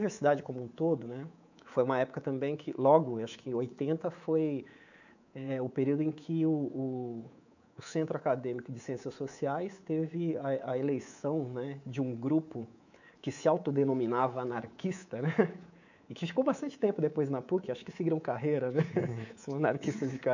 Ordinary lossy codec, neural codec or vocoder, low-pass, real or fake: none; codec, 24 kHz, 3.1 kbps, DualCodec; 7.2 kHz; fake